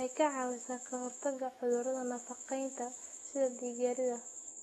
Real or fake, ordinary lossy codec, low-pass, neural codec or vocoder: fake; AAC, 32 kbps; 19.8 kHz; autoencoder, 48 kHz, 128 numbers a frame, DAC-VAE, trained on Japanese speech